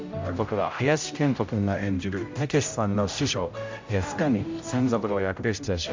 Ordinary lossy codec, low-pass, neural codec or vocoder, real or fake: MP3, 64 kbps; 7.2 kHz; codec, 16 kHz, 0.5 kbps, X-Codec, HuBERT features, trained on general audio; fake